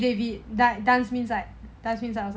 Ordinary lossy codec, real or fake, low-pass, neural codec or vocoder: none; real; none; none